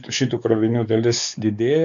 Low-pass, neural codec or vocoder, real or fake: 7.2 kHz; codec, 16 kHz, 4 kbps, X-Codec, WavLM features, trained on Multilingual LibriSpeech; fake